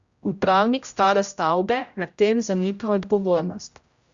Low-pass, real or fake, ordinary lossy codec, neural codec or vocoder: 7.2 kHz; fake; Opus, 64 kbps; codec, 16 kHz, 0.5 kbps, X-Codec, HuBERT features, trained on general audio